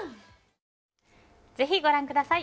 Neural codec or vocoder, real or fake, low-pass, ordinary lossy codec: none; real; none; none